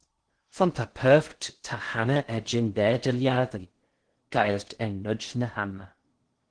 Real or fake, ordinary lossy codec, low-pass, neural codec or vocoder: fake; Opus, 16 kbps; 9.9 kHz; codec, 16 kHz in and 24 kHz out, 0.6 kbps, FocalCodec, streaming, 2048 codes